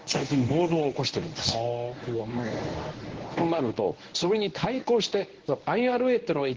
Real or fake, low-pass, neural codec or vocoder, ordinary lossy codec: fake; 7.2 kHz; codec, 24 kHz, 0.9 kbps, WavTokenizer, medium speech release version 1; Opus, 16 kbps